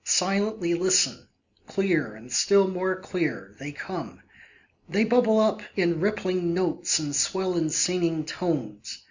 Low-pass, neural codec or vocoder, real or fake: 7.2 kHz; none; real